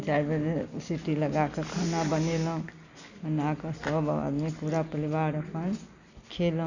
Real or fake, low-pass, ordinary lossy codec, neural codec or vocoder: real; 7.2 kHz; none; none